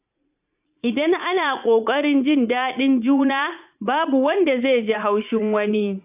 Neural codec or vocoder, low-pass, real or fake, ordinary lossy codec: vocoder, 44.1 kHz, 80 mel bands, Vocos; 3.6 kHz; fake; AAC, 32 kbps